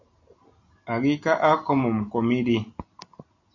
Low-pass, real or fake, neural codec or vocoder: 7.2 kHz; real; none